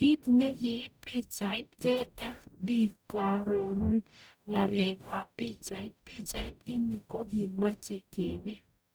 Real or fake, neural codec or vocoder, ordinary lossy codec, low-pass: fake; codec, 44.1 kHz, 0.9 kbps, DAC; none; none